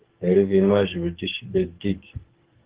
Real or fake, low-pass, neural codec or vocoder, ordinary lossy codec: fake; 3.6 kHz; codec, 44.1 kHz, 2.6 kbps, SNAC; Opus, 16 kbps